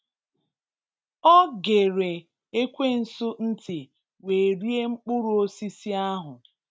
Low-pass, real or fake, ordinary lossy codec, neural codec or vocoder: none; real; none; none